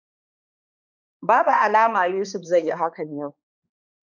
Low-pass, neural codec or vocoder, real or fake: 7.2 kHz; codec, 16 kHz, 2 kbps, X-Codec, HuBERT features, trained on balanced general audio; fake